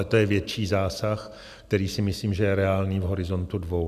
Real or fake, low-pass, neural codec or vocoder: real; 14.4 kHz; none